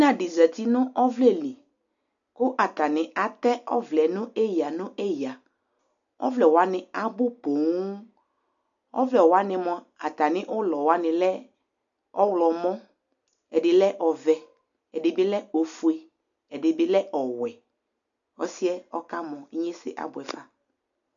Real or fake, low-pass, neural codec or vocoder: real; 7.2 kHz; none